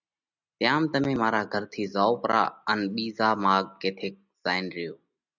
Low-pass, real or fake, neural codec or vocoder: 7.2 kHz; real; none